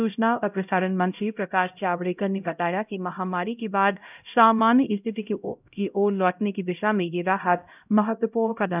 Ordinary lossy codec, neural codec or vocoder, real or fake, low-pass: none; codec, 16 kHz, 0.5 kbps, X-Codec, HuBERT features, trained on LibriSpeech; fake; 3.6 kHz